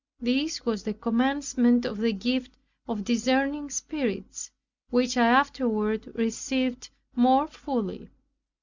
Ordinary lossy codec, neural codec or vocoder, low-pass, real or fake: Opus, 64 kbps; none; 7.2 kHz; real